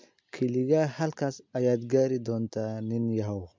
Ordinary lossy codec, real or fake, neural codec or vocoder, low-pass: MP3, 64 kbps; real; none; 7.2 kHz